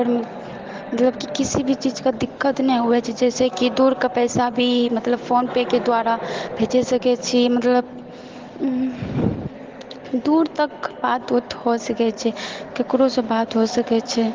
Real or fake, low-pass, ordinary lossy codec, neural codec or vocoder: real; 7.2 kHz; Opus, 16 kbps; none